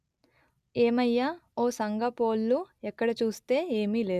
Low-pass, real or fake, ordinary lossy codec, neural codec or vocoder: 14.4 kHz; real; Opus, 64 kbps; none